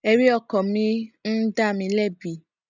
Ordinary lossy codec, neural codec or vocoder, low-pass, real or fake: none; none; 7.2 kHz; real